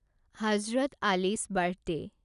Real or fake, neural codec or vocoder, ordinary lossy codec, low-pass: real; none; none; 10.8 kHz